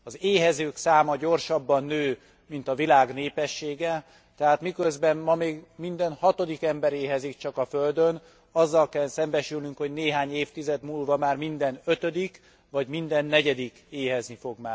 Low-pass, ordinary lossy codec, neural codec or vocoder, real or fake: none; none; none; real